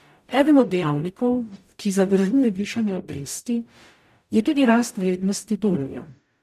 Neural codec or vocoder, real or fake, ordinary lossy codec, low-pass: codec, 44.1 kHz, 0.9 kbps, DAC; fake; none; 14.4 kHz